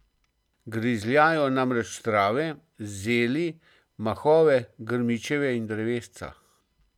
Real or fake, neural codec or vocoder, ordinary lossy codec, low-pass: real; none; none; 19.8 kHz